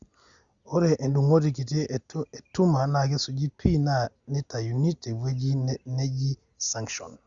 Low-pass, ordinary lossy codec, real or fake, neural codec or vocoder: 7.2 kHz; Opus, 64 kbps; real; none